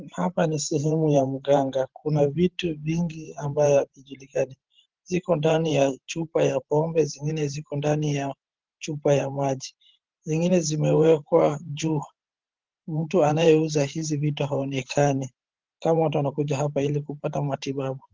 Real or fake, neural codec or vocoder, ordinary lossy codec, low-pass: fake; vocoder, 44.1 kHz, 128 mel bands every 512 samples, BigVGAN v2; Opus, 16 kbps; 7.2 kHz